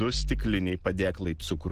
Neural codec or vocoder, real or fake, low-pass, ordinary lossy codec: none; real; 14.4 kHz; Opus, 16 kbps